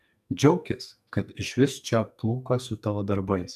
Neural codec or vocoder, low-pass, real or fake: codec, 44.1 kHz, 2.6 kbps, SNAC; 14.4 kHz; fake